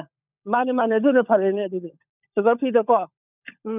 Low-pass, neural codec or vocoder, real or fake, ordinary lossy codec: 3.6 kHz; codec, 16 kHz, 16 kbps, FunCodec, trained on LibriTTS, 50 frames a second; fake; none